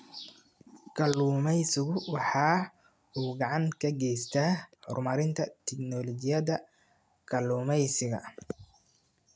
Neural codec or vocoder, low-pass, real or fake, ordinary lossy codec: none; none; real; none